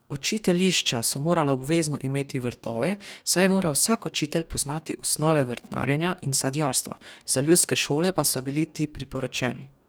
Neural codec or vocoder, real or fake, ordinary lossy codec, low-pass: codec, 44.1 kHz, 2.6 kbps, DAC; fake; none; none